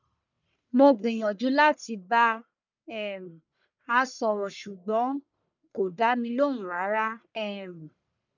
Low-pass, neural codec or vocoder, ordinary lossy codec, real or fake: 7.2 kHz; codec, 44.1 kHz, 1.7 kbps, Pupu-Codec; none; fake